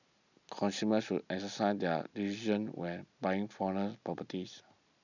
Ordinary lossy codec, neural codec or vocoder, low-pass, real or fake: none; none; 7.2 kHz; real